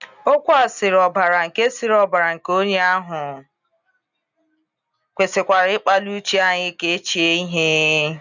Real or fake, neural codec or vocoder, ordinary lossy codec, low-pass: real; none; none; 7.2 kHz